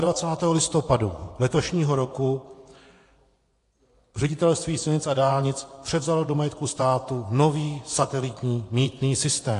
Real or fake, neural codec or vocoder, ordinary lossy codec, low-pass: fake; vocoder, 24 kHz, 100 mel bands, Vocos; AAC, 48 kbps; 10.8 kHz